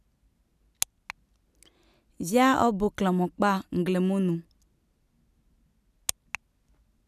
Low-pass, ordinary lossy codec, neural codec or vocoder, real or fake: 14.4 kHz; none; none; real